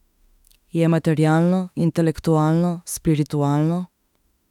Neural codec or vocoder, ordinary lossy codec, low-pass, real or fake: autoencoder, 48 kHz, 32 numbers a frame, DAC-VAE, trained on Japanese speech; none; 19.8 kHz; fake